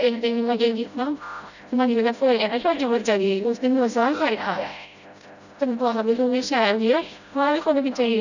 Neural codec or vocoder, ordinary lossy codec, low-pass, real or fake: codec, 16 kHz, 0.5 kbps, FreqCodec, smaller model; none; 7.2 kHz; fake